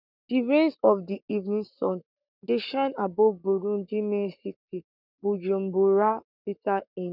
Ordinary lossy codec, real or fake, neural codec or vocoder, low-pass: none; fake; codec, 44.1 kHz, 7.8 kbps, Pupu-Codec; 5.4 kHz